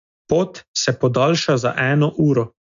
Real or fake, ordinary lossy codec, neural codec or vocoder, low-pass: real; MP3, 64 kbps; none; 7.2 kHz